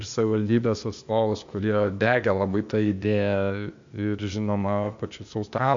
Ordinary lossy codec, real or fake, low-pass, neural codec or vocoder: MP3, 64 kbps; fake; 7.2 kHz; codec, 16 kHz, 0.8 kbps, ZipCodec